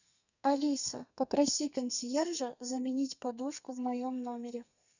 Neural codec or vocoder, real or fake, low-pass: codec, 32 kHz, 1.9 kbps, SNAC; fake; 7.2 kHz